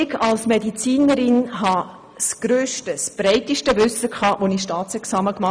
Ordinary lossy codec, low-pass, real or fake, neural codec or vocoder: none; none; real; none